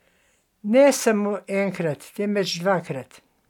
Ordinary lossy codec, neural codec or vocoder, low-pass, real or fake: none; none; 19.8 kHz; real